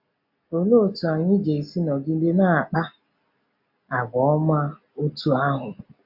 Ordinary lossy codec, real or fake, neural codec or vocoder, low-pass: AAC, 48 kbps; real; none; 5.4 kHz